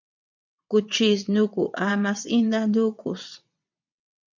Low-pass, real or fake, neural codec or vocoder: 7.2 kHz; fake; vocoder, 22.05 kHz, 80 mel bands, WaveNeXt